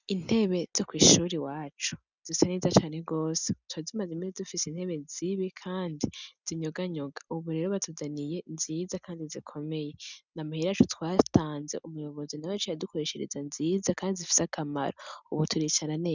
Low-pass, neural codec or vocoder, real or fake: 7.2 kHz; none; real